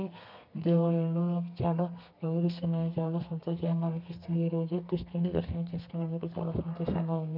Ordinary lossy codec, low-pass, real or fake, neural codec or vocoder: MP3, 48 kbps; 5.4 kHz; fake; codec, 32 kHz, 1.9 kbps, SNAC